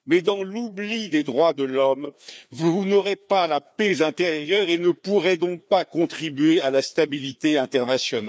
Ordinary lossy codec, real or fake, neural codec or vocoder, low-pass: none; fake; codec, 16 kHz, 2 kbps, FreqCodec, larger model; none